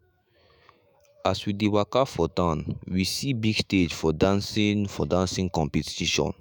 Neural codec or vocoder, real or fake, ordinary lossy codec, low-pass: autoencoder, 48 kHz, 128 numbers a frame, DAC-VAE, trained on Japanese speech; fake; none; none